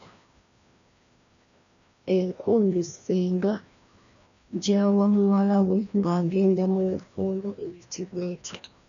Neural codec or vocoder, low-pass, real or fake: codec, 16 kHz, 1 kbps, FreqCodec, larger model; 7.2 kHz; fake